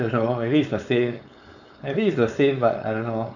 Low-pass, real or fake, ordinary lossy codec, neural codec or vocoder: 7.2 kHz; fake; none; codec, 16 kHz, 4.8 kbps, FACodec